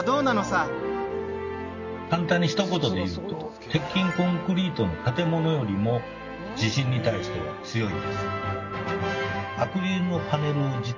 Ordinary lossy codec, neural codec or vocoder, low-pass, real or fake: none; none; 7.2 kHz; real